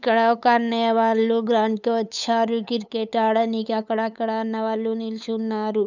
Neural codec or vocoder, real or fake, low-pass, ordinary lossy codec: codec, 16 kHz, 8 kbps, FunCodec, trained on LibriTTS, 25 frames a second; fake; 7.2 kHz; none